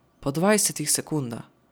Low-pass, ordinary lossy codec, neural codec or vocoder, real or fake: none; none; none; real